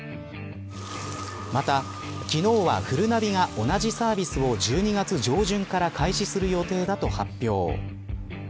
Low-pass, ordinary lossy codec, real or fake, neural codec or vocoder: none; none; real; none